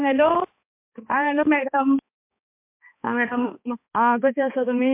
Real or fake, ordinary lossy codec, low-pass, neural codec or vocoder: fake; MP3, 32 kbps; 3.6 kHz; codec, 16 kHz, 2 kbps, X-Codec, HuBERT features, trained on balanced general audio